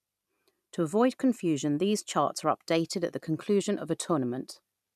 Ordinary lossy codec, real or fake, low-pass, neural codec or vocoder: none; fake; 14.4 kHz; vocoder, 44.1 kHz, 128 mel bands every 256 samples, BigVGAN v2